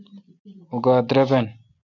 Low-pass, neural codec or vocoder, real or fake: 7.2 kHz; none; real